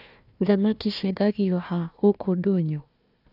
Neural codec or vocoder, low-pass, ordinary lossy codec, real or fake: codec, 16 kHz, 1 kbps, FunCodec, trained on Chinese and English, 50 frames a second; 5.4 kHz; none; fake